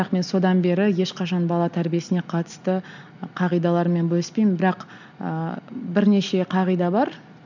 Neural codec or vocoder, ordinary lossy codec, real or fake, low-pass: none; none; real; 7.2 kHz